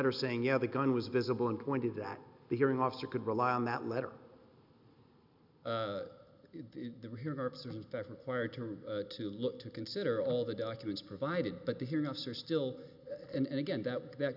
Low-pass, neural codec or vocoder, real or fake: 5.4 kHz; none; real